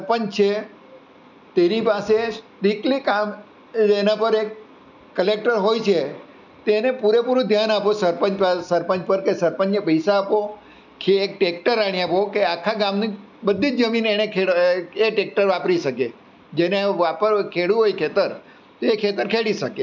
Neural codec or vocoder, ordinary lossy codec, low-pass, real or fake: none; none; 7.2 kHz; real